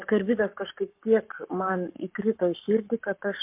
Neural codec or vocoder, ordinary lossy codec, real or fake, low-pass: codec, 44.1 kHz, 7.8 kbps, Pupu-Codec; MP3, 32 kbps; fake; 3.6 kHz